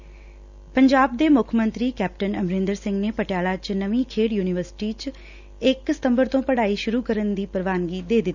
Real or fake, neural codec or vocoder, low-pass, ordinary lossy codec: real; none; 7.2 kHz; none